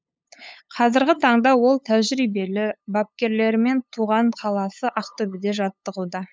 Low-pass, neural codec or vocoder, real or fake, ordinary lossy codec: none; codec, 16 kHz, 8 kbps, FunCodec, trained on LibriTTS, 25 frames a second; fake; none